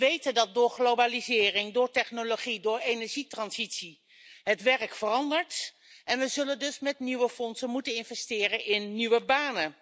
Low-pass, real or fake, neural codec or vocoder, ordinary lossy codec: none; real; none; none